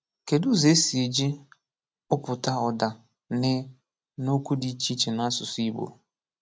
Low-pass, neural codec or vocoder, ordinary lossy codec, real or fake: none; none; none; real